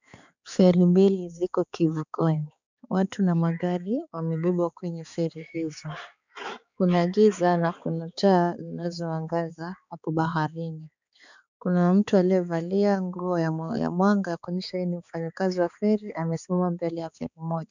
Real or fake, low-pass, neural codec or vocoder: fake; 7.2 kHz; codec, 16 kHz, 4 kbps, X-Codec, HuBERT features, trained on balanced general audio